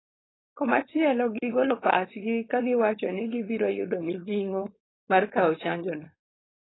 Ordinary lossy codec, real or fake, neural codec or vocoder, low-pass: AAC, 16 kbps; fake; codec, 16 kHz, 4.8 kbps, FACodec; 7.2 kHz